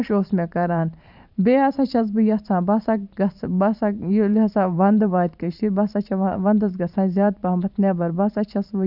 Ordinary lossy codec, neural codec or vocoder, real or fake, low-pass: none; vocoder, 44.1 kHz, 128 mel bands every 512 samples, BigVGAN v2; fake; 5.4 kHz